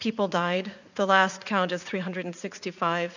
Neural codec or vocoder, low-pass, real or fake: vocoder, 44.1 kHz, 80 mel bands, Vocos; 7.2 kHz; fake